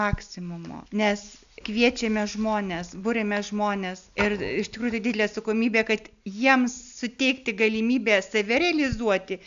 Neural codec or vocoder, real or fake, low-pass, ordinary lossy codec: none; real; 7.2 kHz; AAC, 96 kbps